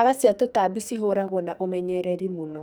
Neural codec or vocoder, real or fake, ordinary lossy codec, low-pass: codec, 44.1 kHz, 2.6 kbps, SNAC; fake; none; none